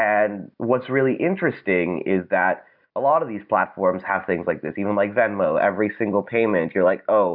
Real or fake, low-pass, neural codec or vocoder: real; 5.4 kHz; none